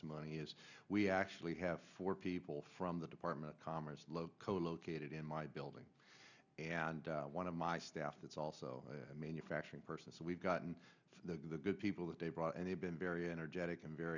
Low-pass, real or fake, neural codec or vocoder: 7.2 kHz; real; none